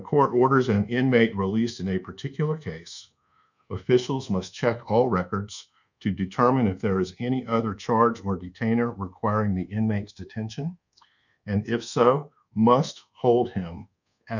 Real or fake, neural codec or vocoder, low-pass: fake; codec, 24 kHz, 1.2 kbps, DualCodec; 7.2 kHz